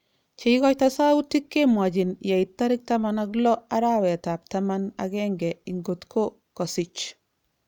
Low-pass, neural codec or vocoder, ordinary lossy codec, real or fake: 19.8 kHz; none; none; real